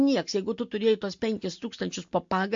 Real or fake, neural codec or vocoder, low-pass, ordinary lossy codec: real; none; 7.2 kHz; MP3, 48 kbps